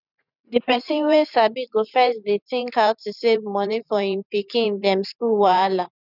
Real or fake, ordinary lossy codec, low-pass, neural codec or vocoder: fake; none; 5.4 kHz; vocoder, 44.1 kHz, 128 mel bands every 512 samples, BigVGAN v2